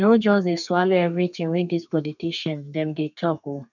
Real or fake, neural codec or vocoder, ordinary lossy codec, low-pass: fake; codec, 32 kHz, 1.9 kbps, SNAC; none; 7.2 kHz